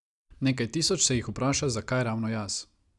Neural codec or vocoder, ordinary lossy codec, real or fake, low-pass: none; none; real; 10.8 kHz